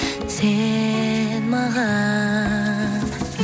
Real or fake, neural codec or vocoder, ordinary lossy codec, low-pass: real; none; none; none